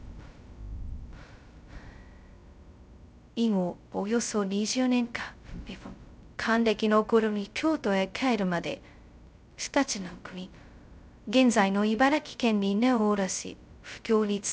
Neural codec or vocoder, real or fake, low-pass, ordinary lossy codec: codec, 16 kHz, 0.2 kbps, FocalCodec; fake; none; none